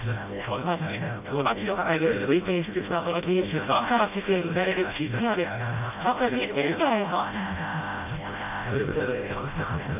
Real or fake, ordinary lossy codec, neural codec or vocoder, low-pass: fake; none; codec, 16 kHz, 0.5 kbps, FreqCodec, smaller model; 3.6 kHz